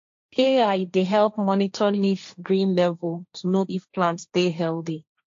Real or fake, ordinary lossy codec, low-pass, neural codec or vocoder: fake; AAC, 48 kbps; 7.2 kHz; codec, 16 kHz, 1.1 kbps, Voila-Tokenizer